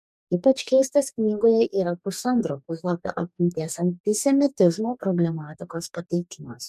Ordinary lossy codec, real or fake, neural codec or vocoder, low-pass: MP3, 96 kbps; fake; codec, 44.1 kHz, 2.6 kbps, DAC; 14.4 kHz